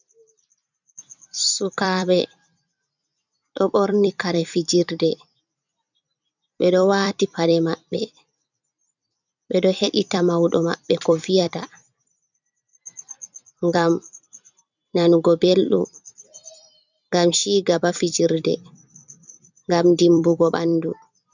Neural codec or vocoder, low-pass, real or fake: vocoder, 44.1 kHz, 128 mel bands every 256 samples, BigVGAN v2; 7.2 kHz; fake